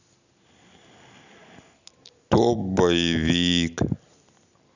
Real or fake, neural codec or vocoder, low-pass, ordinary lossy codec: real; none; 7.2 kHz; none